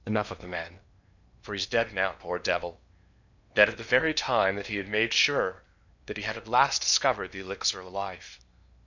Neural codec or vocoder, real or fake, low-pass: codec, 16 kHz in and 24 kHz out, 0.8 kbps, FocalCodec, streaming, 65536 codes; fake; 7.2 kHz